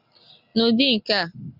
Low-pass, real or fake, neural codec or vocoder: 5.4 kHz; real; none